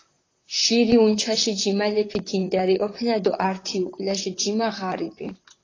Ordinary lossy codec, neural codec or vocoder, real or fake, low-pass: AAC, 32 kbps; vocoder, 44.1 kHz, 128 mel bands, Pupu-Vocoder; fake; 7.2 kHz